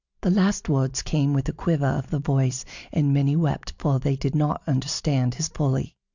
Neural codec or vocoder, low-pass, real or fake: none; 7.2 kHz; real